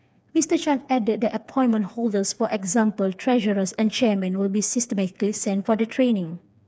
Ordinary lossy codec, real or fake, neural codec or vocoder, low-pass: none; fake; codec, 16 kHz, 4 kbps, FreqCodec, smaller model; none